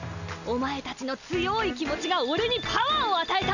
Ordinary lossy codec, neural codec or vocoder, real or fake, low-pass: none; none; real; 7.2 kHz